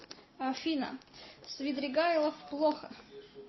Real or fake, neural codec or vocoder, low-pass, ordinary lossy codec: real; none; 7.2 kHz; MP3, 24 kbps